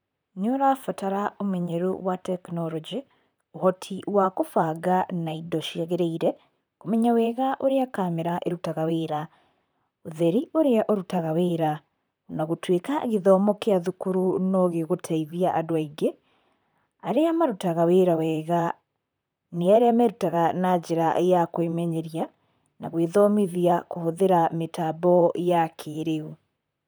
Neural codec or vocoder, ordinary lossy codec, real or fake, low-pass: vocoder, 44.1 kHz, 128 mel bands, Pupu-Vocoder; none; fake; none